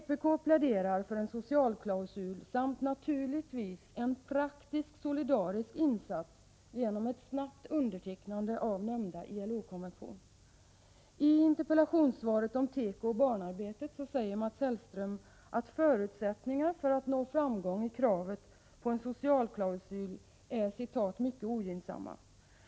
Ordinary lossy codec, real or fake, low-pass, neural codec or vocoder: none; real; none; none